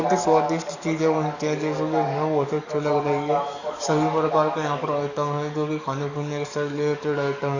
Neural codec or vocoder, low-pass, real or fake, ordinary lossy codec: codec, 44.1 kHz, 7.8 kbps, DAC; 7.2 kHz; fake; none